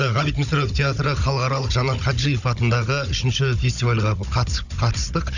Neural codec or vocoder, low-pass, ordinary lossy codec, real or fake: codec, 16 kHz, 16 kbps, FunCodec, trained on Chinese and English, 50 frames a second; 7.2 kHz; none; fake